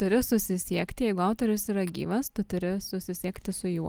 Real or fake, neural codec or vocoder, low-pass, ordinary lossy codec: real; none; 19.8 kHz; Opus, 24 kbps